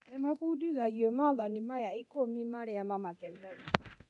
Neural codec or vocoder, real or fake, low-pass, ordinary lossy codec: codec, 24 kHz, 0.9 kbps, DualCodec; fake; 10.8 kHz; none